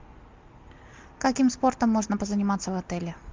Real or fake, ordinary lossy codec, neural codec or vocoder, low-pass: real; Opus, 32 kbps; none; 7.2 kHz